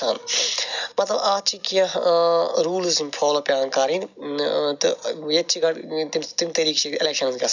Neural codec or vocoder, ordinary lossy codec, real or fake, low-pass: none; none; real; 7.2 kHz